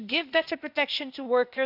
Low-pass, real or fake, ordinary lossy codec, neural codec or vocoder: 5.4 kHz; fake; none; codec, 16 kHz, 0.8 kbps, ZipCodec